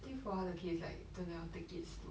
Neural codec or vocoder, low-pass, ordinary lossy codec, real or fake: none; none; none; real